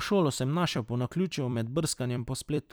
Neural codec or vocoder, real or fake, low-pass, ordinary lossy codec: vocoder, 44.1 kHz, 128 mel bands every 256 samples, BigVGAN v2; fake; none; none